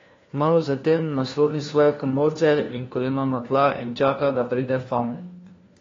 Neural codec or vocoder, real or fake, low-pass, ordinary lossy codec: codec, 16 kHz, 1 kbps, FunCodec, trained on LibriTTS, 50 frames a second; fake; 7.2 kHz; AAC, 32 kbps